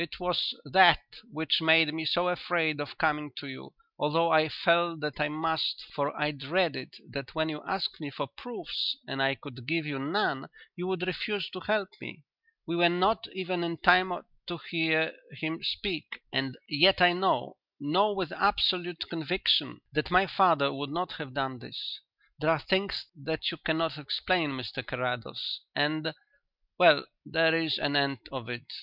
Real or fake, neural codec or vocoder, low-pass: real; none; 5.4 kHz